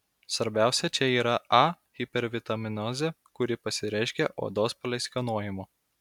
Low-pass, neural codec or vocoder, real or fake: 19.8 kHz; none; real